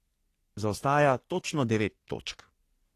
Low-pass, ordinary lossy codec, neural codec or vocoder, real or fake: 14.4 kHz; AAC, 48 kbps; codec, 44.1 kHz, 3.4 kbps, Pupu-Codec; fake